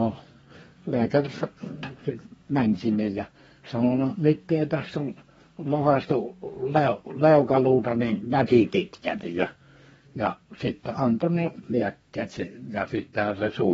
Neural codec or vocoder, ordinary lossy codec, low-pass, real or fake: codec, 32 kHz, 1.9 kbps, SNAC; AAC, 24 kbps; 14.4 kHz; fake